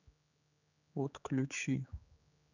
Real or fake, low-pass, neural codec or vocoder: fake; 7.2 kHz; codec, 16 kHz, 4 kbps, X-Codec, HuBERT features, trained on general audio